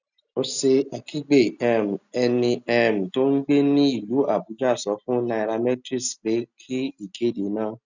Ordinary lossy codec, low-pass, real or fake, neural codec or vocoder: none; 7.2 kHz; real; none